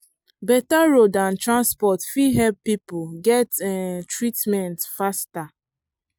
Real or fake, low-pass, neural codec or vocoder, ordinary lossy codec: real; none; none; none